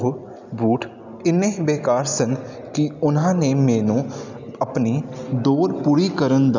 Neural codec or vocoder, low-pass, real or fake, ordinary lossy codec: none; 7.2 kHz; real; none